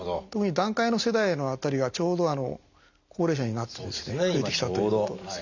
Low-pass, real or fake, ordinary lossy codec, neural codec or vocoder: 7.2 kHz; real; none; none